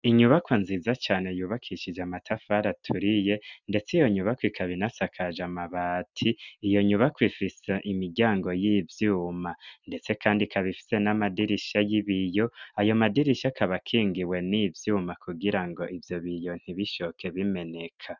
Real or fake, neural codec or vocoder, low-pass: real; none; 7.2 kHz